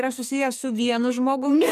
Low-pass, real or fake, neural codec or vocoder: 14.4 kHz; fake; codec, 44.1 kHz, 2.6 kbps, SNAC